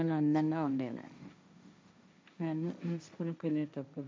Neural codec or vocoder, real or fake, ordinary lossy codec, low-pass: codec, 16 kHz, 1.1 kbps, Voila-Tokenizer; fake; none; none